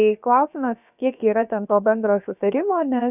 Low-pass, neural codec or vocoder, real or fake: 3.6 kHz; codec, 16 kHz, about 1 kbps, DyCAST, with the encoder's durations; fake